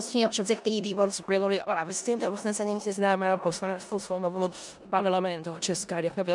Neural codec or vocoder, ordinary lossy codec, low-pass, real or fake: codec, 16 kHz in and 24 kHz out, 0.4 kbps, LongCat-Audio-Codec, four codebook decoder; MP3, 96 kbps; 10.8 kHz; fake